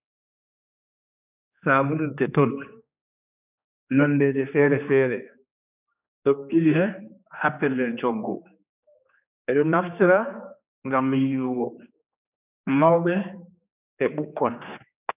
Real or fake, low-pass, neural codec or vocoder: fake; 3.6 kHz; codec, 16 kHz, 2 kbps, X-Codec, HuBERT features, trained on general audio